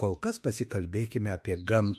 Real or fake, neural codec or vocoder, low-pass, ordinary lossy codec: fake; autoencoder, 48 kHz, 32 numbers a frame, DAC-VAE, trained on Japanese speech; 14.4 kHz; MP3, 64 kbps